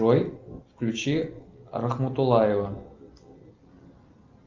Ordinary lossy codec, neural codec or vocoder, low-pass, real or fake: Opus, 24 kbps; none; 7.2 kHz; real